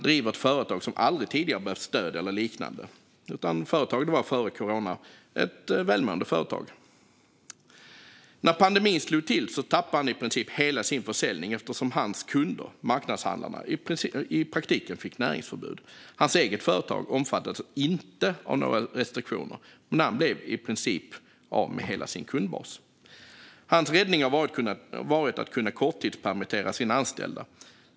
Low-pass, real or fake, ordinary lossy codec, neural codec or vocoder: none; real; none; none